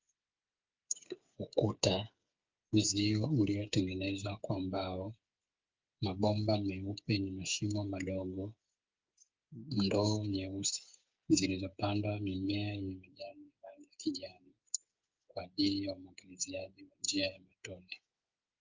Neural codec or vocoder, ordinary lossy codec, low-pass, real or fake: codec, 16 kHz, 8 kbps, FreqCodec, smaller model; Opus, 24 kbps; 7.2 kHz; fake